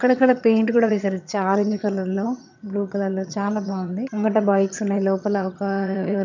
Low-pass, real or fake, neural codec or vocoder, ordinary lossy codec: 7.2 kHz; fake; vocoder, 22.05 kHz, 80 mel bands, HiFi-GAN; none